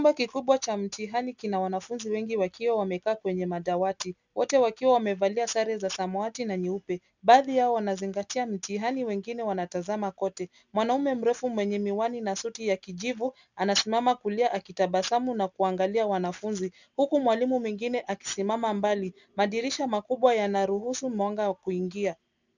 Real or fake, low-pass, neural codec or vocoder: real; 7.2 kHz; none